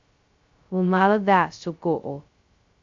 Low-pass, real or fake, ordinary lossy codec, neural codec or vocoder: 7.2 kHz; fake; Opus, 64 kbps; codec, 16 kHz, 0.2 kbps, FocalCodec